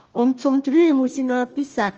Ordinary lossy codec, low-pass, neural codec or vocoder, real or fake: Opus, 24 kbps; 7.2 kHz; codec, 16 kHz, 1 kbps, FunCodec, trained on Chinese and English, 50 frames a second; fake